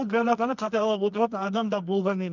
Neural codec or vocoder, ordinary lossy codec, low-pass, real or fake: codec, 24 kHz, 0.9 kbps, WavTokenizer, medium music audio release; none; 7.2 kHz; fake